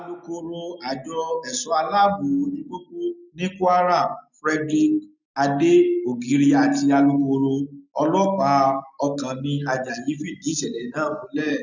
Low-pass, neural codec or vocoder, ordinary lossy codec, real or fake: 7.2 kHz; none; none; real